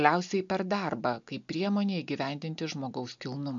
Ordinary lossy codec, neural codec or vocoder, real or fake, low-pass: MP3, 64 kbps; none; real; 7.2 kHz